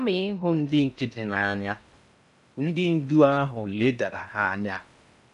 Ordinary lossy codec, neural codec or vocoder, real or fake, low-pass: none; codec, 16 kHz in and 24 kHz out, 0.6 kbps, FocalCodec, streaming, 4096 codes; fake; 10.8 kHz